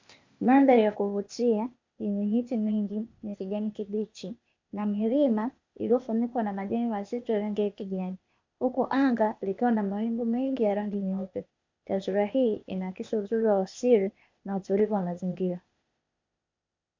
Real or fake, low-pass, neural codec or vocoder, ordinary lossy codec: fake; 7.2 kHz; codec, 16 kHz, 0.8 kbps, ZipCodec; MP3, 64 kbps